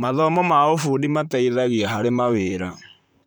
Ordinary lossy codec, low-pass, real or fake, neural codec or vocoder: none; none; fake; vocoder, 44.1 kHz, 128 mel bands, Pupu-Vocoder